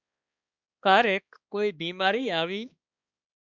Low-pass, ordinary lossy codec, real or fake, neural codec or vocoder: 7.2 kHz; Opus, 64 kbps; fake; codec, 16 kHz, 2 kbps, X-Codec, HuBERT features, trained on balanced general audio